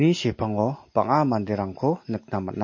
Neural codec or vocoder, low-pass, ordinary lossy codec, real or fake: none; 7.2 kHz; MP3, 32 kbps; real